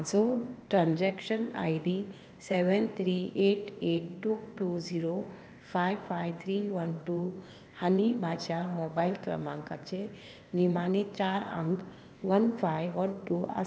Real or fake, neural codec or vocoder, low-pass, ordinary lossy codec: fake; codec, 16 kHz, 0.8 kbps, ZipCodec; none; none